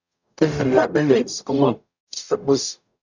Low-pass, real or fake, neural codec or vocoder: 7.2 kHz; fake; codec, 44.1 kHz, 0.9 kbps, DAC